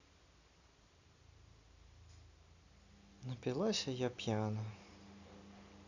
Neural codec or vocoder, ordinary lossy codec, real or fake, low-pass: none; none; real; 7.2 kHz